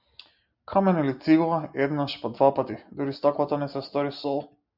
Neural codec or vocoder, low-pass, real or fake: none; 5.4 kHz; real